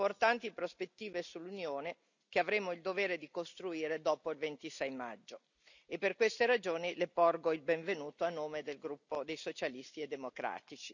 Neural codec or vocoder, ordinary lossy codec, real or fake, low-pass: none; none; real; 7.2 kHz